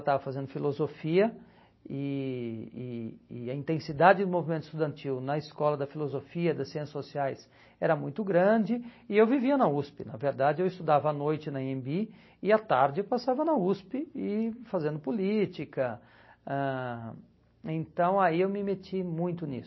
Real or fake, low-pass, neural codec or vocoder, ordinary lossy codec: real; 7.2 kHz; none; MP3, 24 kbps